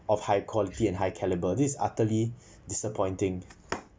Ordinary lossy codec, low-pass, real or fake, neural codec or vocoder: none; none; real; none